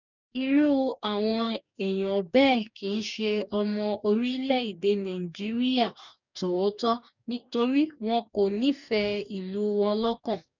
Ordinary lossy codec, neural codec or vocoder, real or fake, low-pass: none; codec, 44.1 kHz, 2.6 kbps, DAC; fake; 7.2 kHz